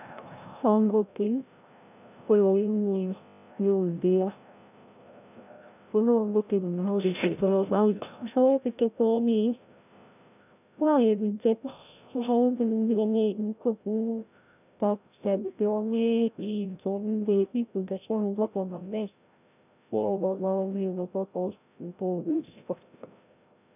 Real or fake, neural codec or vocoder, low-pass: fake; codec, 16 kHz, 0.5 kbps, FreqCodec, larger model; 3.6 kHz